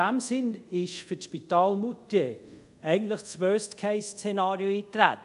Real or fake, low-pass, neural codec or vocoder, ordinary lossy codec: fake; 10.8 kHz; codec, 24 kHz, 0.5 kbps, DualCodec; none